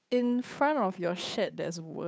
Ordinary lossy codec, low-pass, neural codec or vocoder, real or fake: none; none; codec, 16 kHz, 8 kbps, FunCodec, trained on Chinese and English, 25 frames a second; fake